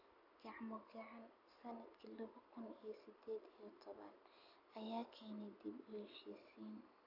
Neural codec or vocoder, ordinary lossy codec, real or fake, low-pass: none; AAC, 48 kbps; real; 5.4 kHz